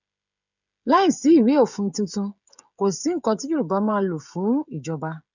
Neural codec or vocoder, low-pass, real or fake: codec, 16 kHz, 16 kbps, FreqCodec, smaller model; 7.2 kHz; fake